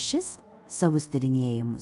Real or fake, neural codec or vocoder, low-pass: fake; codec, 24 kHz, 0.5 kbps, DualCodec; 10.8 kHz